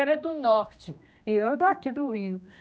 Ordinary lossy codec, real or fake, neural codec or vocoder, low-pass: none; fake; codec, 16 kHz, 1 kbps, X-Codec, HuBERT features, trained on general audio; none